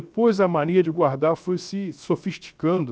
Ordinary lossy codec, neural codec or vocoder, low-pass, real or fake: none; codec, 16 kHz, 0.7 kbps, FocalCodec; none; fake